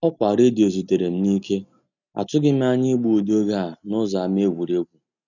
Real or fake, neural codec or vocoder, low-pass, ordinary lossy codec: real; none; 7.2 kHz; none